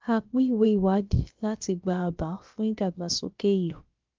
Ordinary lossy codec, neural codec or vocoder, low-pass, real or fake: Opus, 32 kbps; codec, 16 kHz, about 1 kbps, DyCAST, with the encoder's durations; 7.2 kHz; fake